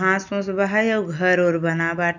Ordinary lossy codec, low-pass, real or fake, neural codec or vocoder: none; 7.2 kHz; real; none